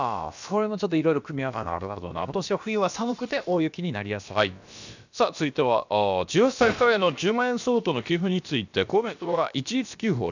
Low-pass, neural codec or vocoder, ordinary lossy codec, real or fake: 7.2 kHz; codec, 16 kHz, about 1 kbps, DyCAST, with the encoder's durations; none; fake